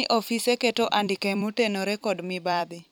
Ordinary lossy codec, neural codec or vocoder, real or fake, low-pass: none; vocoder, 44.1 kHz, 128 mel bands every 256 samples, BigVGAN v2; fake; none